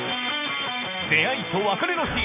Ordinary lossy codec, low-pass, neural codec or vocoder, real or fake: none; 3.6 kHz; none; real